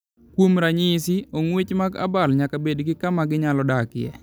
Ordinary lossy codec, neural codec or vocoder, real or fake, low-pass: none; none; real; none